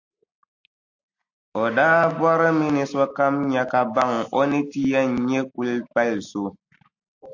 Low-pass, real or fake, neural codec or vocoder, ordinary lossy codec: 7.2 kHz; real; none; AAC, 48 kbps